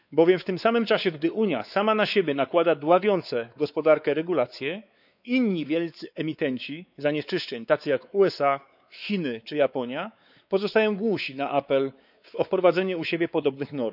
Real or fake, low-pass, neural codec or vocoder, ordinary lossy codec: fake; 5.4 kHz; codec, 16 kHz, 4 kbps, X-Codec, WavLM features, trained on Multilingual LibriSpeech; none